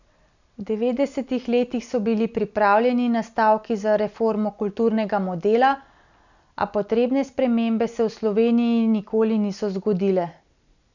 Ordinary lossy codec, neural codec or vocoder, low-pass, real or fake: none; none; 7.2 kHz; real